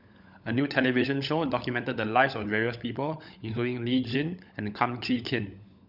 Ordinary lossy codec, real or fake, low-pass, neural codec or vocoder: none; fake; 5.4 kHz; codec, 16 kHz, 16 kbps, FunCodec, trained on LibriTTS, 50 frames a second